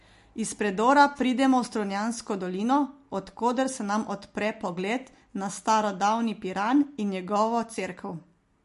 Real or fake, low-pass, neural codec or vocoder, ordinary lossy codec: real; 14.4 kHz; none; MP3, 48 kbps